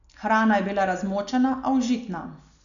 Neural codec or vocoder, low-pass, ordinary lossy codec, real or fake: none; 7.2 kHz; none; real